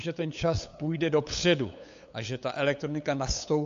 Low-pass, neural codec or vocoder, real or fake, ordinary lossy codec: 7.2 kHz; codec, 16 kHz, 8 kbps, FunCodec, trained on LibriTTS, 25 frames a second; fake; AAC, 64 kbps